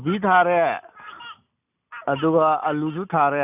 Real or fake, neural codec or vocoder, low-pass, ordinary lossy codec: real; none; 3.6 kHz; none